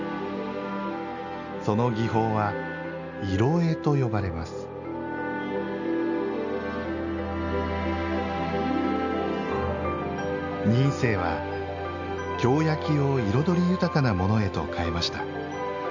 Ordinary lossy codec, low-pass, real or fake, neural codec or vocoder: none; 7.2 kHz; real; none